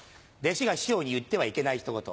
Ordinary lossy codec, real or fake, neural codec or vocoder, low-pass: none; real; none; none